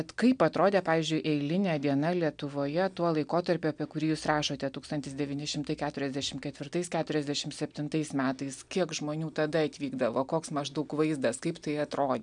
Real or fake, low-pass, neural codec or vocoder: real; 9.9 kHz; none